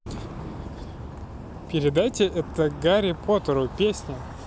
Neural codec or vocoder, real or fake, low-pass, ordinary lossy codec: none; real; none; none